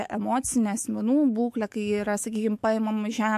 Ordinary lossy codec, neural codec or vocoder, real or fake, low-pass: MP3, 64 kbps; autoencoder, 48 kHz, 128 numbers a frame, DAC-VAE, trained on Japanese speech; fake; 14.4 kHz